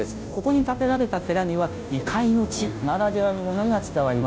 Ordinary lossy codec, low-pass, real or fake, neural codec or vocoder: none; none; fake; codec, 16 kHz, 0.5 kbps, FunCodec, trained on Chinese and English, 25 frames a second